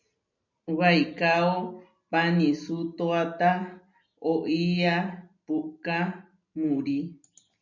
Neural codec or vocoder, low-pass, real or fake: none; 7.2 kHz; real